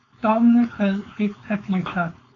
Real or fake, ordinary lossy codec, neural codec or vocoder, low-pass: fake; AAC, 32 kbps; codec, 16 kHz, 4.8 kbps, FACodec; 7.2 kHz